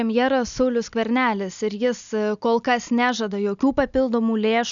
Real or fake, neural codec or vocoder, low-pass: real; none; 7.2 kHz